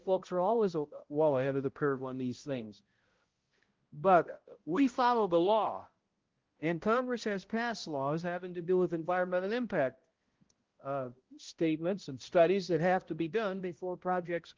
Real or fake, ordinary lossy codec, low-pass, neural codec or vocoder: fake; Opus, 16 kbps; 7.2 kHz; codec, 16 kHz, 0.5 kbps, X-Codec, HuBERT features, trained on balanced general audio